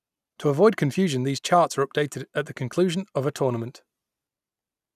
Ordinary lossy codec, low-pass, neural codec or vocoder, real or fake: none; 14.4 kHz; none; real